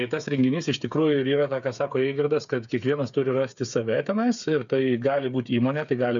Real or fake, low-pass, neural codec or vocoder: fake; 7.2 kHz; codec, 16 kHz, 8 kbps, FreqCodec, smaller model